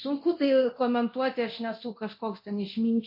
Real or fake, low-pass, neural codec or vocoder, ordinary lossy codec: fake; 5.4 kHz; codec, 24 kHz, 0.9 kbps, DualCodec; MP3, 32 kbps